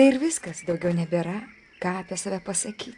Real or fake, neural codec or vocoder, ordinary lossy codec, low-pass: real; none; AAC, 64 kbps; 10.8 kHz